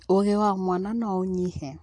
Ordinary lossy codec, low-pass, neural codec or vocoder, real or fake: AAC, 48 kbps; 10.8 kHz; none; real